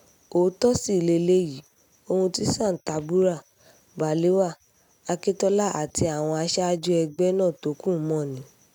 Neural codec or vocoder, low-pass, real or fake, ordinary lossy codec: none; 19.8 kHz; real; none